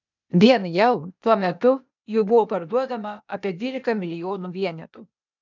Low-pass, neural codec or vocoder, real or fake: 7.2 kHz; codec, 16 kHz, 0.8 kbps, ZipCodec; fake